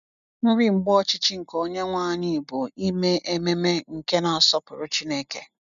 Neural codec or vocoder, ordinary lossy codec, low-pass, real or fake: none; none; 7.2 kHz; real